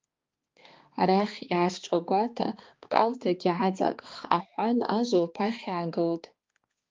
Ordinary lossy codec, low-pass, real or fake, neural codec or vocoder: Opus, 24 kbps; 7.2 kHz; fake; codec, 16 kHz, 2 kbps, X-Codec, HuBERT features, trained on balanced general audio